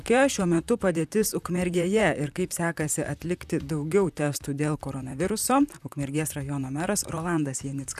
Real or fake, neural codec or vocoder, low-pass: fake; vocoder, 44.1 kHz, 128 mel bands, Pupu-Vocoder; 14.4 kHz